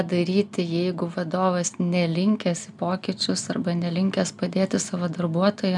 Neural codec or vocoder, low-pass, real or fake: none; 10.8 kHz; real